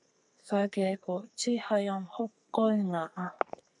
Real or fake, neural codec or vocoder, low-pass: fake; codec, 44.1 kHz, 2.6 kbps, SNAC; 10.8 kHz